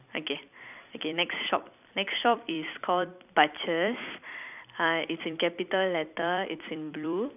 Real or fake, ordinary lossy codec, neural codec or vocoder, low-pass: fake; none; vocoder, 44.1 kHz, 128 mel bands every 256 samples, BigVGAN v2; 3.6 kHz